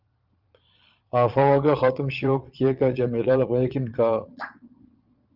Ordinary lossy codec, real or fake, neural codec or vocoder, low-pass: Opus, 24 kbps; fake; vocoder, 22.05 kHz, 80 mel bands, Vocos; 5.4 kHz